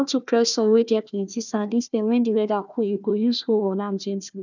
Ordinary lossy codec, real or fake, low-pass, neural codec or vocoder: none; fake; 7.2 kHz; codec, 16 kHz, 1 kbps, FunCodec, trained on Chinese and English, 50 frames a second